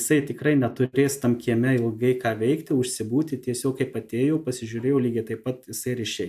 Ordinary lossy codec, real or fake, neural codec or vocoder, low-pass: AAC, 96 kbps; real; none; 14.4 kHz